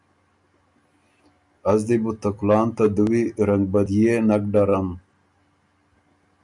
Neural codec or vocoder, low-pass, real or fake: none; 10.8 kHz; real